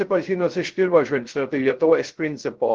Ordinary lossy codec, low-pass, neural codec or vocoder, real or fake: Opus, 16 kbps; 7.2 kHz; codec, 16 kHz, 0.3 kbps, FocalCodec; fake